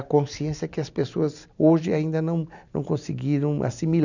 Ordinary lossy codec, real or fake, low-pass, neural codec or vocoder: none; real; 7.2 kHz; none